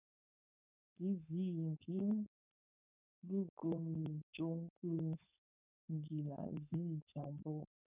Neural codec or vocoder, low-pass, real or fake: codec, 16 kHz, 4.8 kbps, FACodec; 3.6 kHz; fake